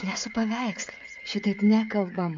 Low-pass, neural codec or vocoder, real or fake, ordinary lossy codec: 7.2 kHz; codec, 16 kHz, 16 kbps, FreqCodec, smaller model; fake; AAC, 64 kbps